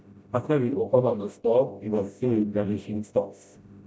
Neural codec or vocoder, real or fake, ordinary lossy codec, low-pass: codec, 16 kHz, 0.5 kbps, FreqCodec, smaller model; fake; none; none